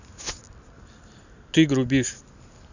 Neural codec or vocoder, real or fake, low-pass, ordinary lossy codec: none; real; 7.2 kHz; none